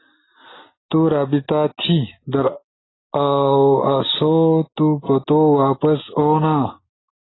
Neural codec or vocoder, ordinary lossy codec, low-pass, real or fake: none; AAC, 16 kbps; 7.2 kHz; real